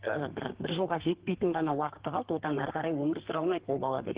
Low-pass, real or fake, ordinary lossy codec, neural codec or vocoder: 3.6 kHz; fake; Opus, 16 kbps; codec, 32 kHz, 1.9 kbps, SNAC